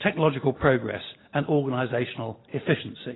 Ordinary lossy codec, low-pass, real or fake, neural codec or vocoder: AAC, 16 kbps; 7.2 kHz; real; none